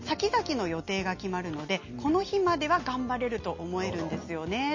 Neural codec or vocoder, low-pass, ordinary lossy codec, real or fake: none; 7.2 kHz; MP3, 32 kbps; real